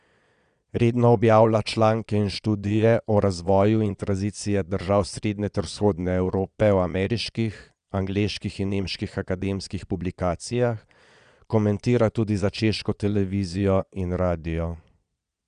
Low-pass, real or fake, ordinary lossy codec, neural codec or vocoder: 9.9 kHz; fake; none; vocoder, 22.05 kHz, 80 mel bands, Vocos